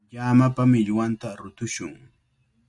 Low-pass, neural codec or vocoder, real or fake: 10.8 kHz; none; real